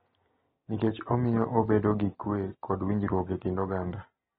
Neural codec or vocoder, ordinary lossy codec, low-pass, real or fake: none; AAC, 16 kbps; 19.8 kHz; real